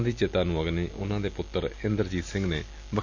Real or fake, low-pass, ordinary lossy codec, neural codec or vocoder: real; 7.2 kHz; none; none